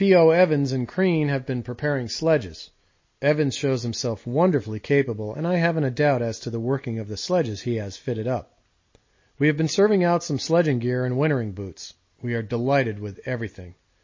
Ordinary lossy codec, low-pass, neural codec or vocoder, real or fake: MP3, 32 kbps; 7.2 kHz; none; real